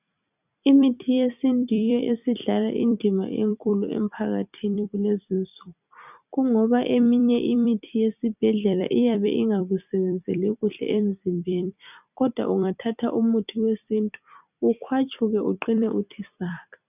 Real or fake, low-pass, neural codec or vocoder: fake; 3.6 kHz; vocoder, 44.1 kHz, 128 mel bands every 256 samples, BigVGAN v2